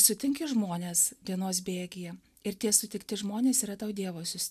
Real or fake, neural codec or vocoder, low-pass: real; none; 14.4 kHz